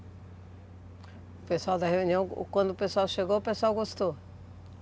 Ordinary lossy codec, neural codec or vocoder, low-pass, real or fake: none; none; none; real